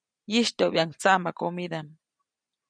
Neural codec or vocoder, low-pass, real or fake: none; 9.9 kHz; real